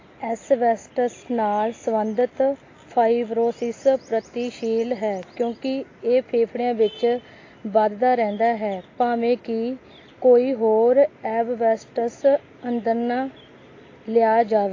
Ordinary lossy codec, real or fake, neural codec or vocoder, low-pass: AAC, 32 kbps; real; none; 7.2 kHz